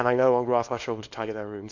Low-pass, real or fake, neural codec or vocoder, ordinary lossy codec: 7.2 kHz; fake; codec, 24 kHz, 0.9 kbps, WavTokenizer, small release; MP3, 48 kbps